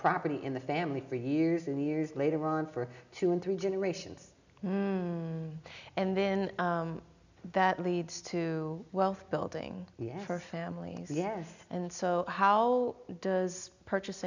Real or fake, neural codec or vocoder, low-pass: real; none; 7.2 kHz